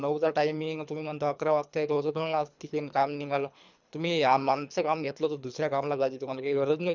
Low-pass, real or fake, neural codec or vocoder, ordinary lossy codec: 7.2 kHz; fake; codec, 24 kHz, 3 kbps, HILCodec; none